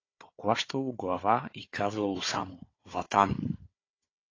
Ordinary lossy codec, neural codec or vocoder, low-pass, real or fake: AAC, 32 kbps; codec, 16 kHz, 4 kbps, FunCodec, trained on Chinese and English, 50 frames a second; 7.2 kHz; fake